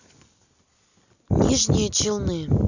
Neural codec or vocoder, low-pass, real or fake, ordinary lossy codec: none; 7.2 kHz; real; none